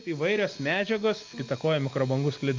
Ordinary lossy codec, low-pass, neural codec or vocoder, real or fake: Opus, 24 kbps; 7.2 kHz; none; real